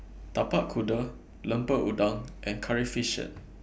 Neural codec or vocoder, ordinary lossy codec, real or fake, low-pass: none; none; real; none